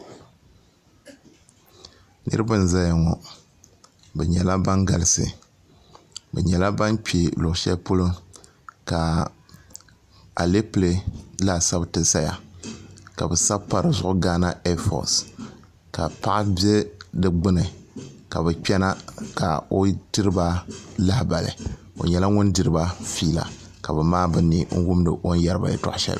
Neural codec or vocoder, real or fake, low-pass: none; real; 14.4 kHz